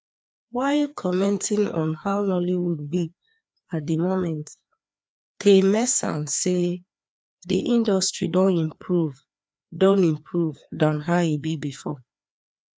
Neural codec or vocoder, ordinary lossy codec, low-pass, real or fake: codec, 16 kHz, 2 kbps, FreqCodec, larger model; none; none; fake